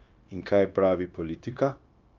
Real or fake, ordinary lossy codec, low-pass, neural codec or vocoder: fake; Opus, 24 kbps; 7.2 kHz; codec, 16 kHz, 0.9 kbps, LongCat-Audio-Codec